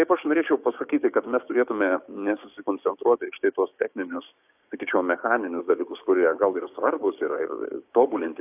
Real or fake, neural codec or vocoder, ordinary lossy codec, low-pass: fake; codec, 16 kHz, 6 kbps, DAC; AAC, 32 kbps; 3.6 kHz